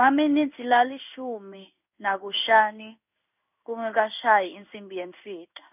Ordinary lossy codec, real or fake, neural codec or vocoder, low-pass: none; fake; codec, 16 kHz in and 24 kHz out, 1 kbps, XY-Tokenizer; 3.6 kHz